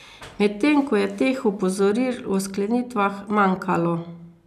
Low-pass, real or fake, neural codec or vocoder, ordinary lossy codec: 14.4 kHz; real; none; none